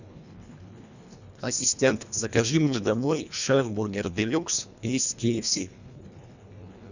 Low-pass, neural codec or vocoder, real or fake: 7.2 kHz; codec, 24 kHz, 1.5 kbps, HILCodec; fake